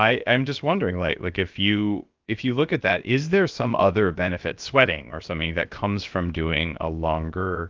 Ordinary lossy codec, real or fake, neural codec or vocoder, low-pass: Opus, 32 kbps; fake; codec, 16 kHz, about 1 kbps, DyCAST, with the encoder's durations; 7.2 kHz